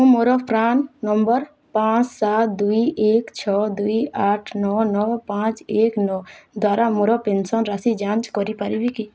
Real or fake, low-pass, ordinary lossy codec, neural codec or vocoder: real; none; none; none